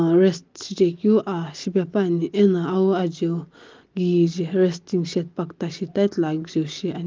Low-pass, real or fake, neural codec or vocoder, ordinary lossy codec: 7.2 kHz; real; none; Opus, 32 kbps